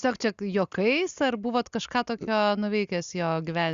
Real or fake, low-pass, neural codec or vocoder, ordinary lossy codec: real; 7.2 kHz; none; Opus, 64 kbps